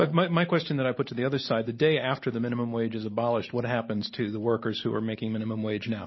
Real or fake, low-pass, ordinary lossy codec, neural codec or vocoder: real; 7.2 kHz; MP3, 24 kbps; none